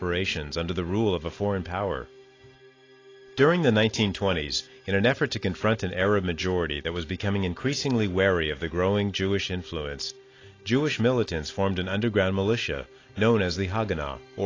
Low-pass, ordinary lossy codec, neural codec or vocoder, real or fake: 7.2 kHz; AAC, 32 kbps; none; real